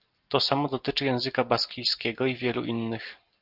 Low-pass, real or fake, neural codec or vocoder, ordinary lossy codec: 5.4 kHz; real; none; Opus, 32 kbps